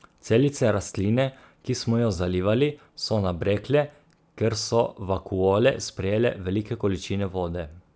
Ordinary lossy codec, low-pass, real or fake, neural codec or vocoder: none; none; real; none